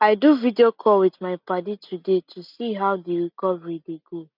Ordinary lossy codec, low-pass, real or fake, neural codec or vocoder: none; 5.4 kHz; real; none